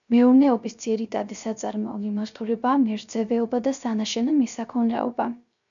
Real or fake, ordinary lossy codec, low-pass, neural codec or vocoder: fake; MP3, 96 kbps; 7.2 kHz; codec, 16 kHz, 0.3 kbps, FocalCodec